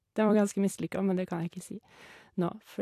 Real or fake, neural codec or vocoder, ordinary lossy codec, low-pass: fake; vocoder, 44.1 kHz, 128 mel bands every 256 samples, BigVGAN v2; AAC, 64 kbps; 14.4 kHz